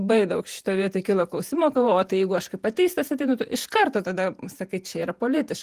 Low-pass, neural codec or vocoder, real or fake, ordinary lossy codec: 14.4 kHz; vocoder, 48 kHz, 128 mel bands, Vocos; fake; Opus, 24 kbps